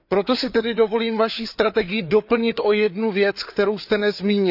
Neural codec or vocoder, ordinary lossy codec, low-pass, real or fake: codec, 16 kHz, 4 kbps, FreqCodec, larger model; none; 5.4 kHz; fake